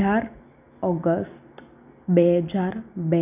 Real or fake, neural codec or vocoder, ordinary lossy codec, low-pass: real; none; none; 3.6 kHz